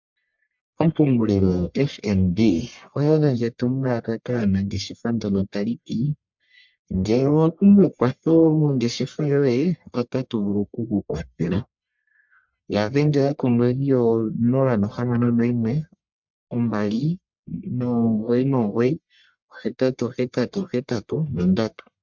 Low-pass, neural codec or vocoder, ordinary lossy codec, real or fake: 7.2 kHz; codec, 44.1 kHz, 1.7 kbps, Pupu-Codec; MP3, 64 kbps; fake